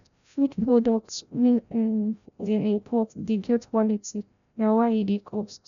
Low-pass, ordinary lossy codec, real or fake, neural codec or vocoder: 7.2 kHz; none; fake; codec, 16 kHz, 0.5 kbps, FreqCodec, larger model